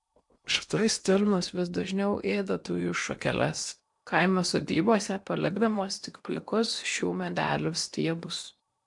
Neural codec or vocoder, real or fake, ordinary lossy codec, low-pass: codec, 16 kHz in and 24 kHz out, 0.8 kbps, FocalCodec, streaming, 65536 codes; fake; MP3, 96 kbps; 10.8 kHz